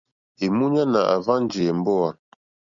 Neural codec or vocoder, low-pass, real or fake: none; 7.2 kHz; real